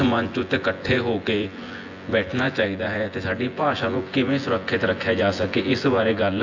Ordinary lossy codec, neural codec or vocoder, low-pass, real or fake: AAC, 48 kbps; vocoder, 24 kHz, 100 mel bands, Vocos; 7.2 kHz; fake